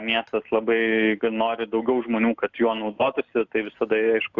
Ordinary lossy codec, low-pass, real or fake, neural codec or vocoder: Opus, 64 kbps; 7.2 kHz; real; none